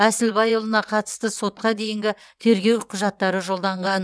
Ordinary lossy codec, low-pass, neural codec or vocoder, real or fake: none; none; vocoder, 22.05 kHz, 80 mel bands, WaveNeXt; fake